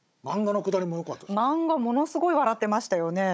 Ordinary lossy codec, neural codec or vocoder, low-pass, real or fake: none; codec, 16 kHz, 16 kbps, FunCodec, trained on Chinese and English, 50 frames a second; none; fake